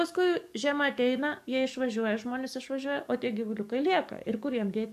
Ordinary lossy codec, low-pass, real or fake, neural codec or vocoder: MP3, 96 kbps; 14.4 kHz; fake; codec, 44.1 kHz, 7.8 kbps, DAC